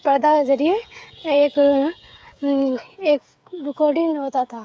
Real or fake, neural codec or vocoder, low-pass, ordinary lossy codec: fake; codec, 16 kHz, 8 kbps, FreqCodec, smaller model; none; none